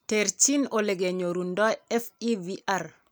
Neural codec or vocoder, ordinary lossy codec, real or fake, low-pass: none; none; real; none